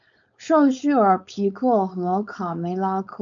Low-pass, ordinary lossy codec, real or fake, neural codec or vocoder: 7.2 kHz; MP3, 64 kbps; fake; codec, 16 kHz, 4.8 kbps, FACodec